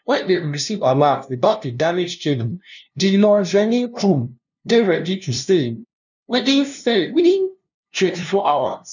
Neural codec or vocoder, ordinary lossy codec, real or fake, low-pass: codec, 16 kHz, 0.5 kbps, FunCodec, trained on LibriTTS, 25 frames a second; none; fake; 7.2 kHz